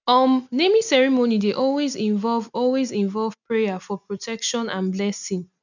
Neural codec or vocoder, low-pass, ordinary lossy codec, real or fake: none; 7.2 kHz; none; real